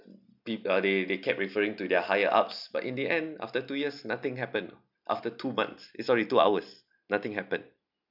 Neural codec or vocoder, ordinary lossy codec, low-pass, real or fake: none; none; 5.4 kHz; real